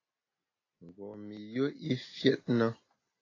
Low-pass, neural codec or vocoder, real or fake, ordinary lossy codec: 7.2 kHz; none; real; AAC, 32 kbps